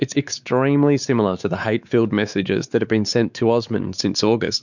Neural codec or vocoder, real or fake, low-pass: codec, 16 kHz, 4 kbps, X-Codec, WavLM features, trained on Multilingual LibriSpeech; fake; 7.2 kHz